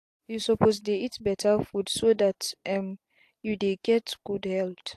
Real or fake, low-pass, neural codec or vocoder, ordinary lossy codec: real; 14.4 kHz; none; AAC, 64 kbps